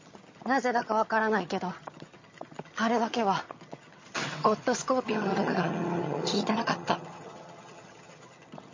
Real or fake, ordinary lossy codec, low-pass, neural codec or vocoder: fake; MP3, 32 kbps; 7.2 kHz; vocoder, 22.05 kHz, 80 mel bands, HiFi-GAN